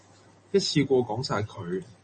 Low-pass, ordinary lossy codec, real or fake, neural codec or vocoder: 10.8 kHz; MP3, 32 kbps; real; none